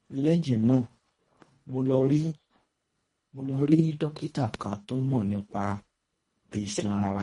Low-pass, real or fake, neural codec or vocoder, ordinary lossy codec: 10.8 kHz; fake; codec, 24 kHz, 1.5 kbps, HILCodec; MP3, 48 kbps